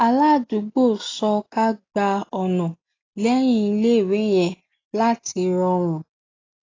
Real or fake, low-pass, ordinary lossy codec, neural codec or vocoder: real; 7.2 kHz; AAC, 32 kbps; none